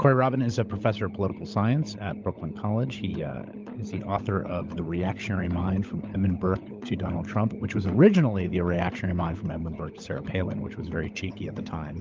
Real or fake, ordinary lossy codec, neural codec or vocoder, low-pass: fake; Opus, 32 kbps; codec, 16 kHz, 16 kbps, FunCodec, trained on LibriTTS, 50 frames a second; 7.2 kHz